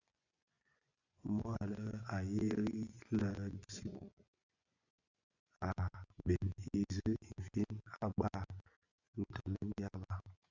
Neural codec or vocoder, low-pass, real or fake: vocoder, 24 kHz, 100 mel bands, Vocos; 7.2 kHz; fake